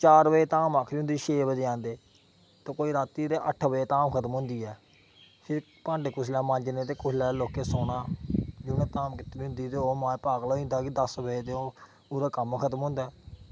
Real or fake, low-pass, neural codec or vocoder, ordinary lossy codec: real; none; none; none